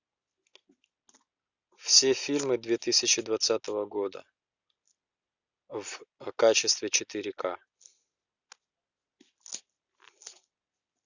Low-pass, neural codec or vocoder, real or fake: 7.2 kHz; none; real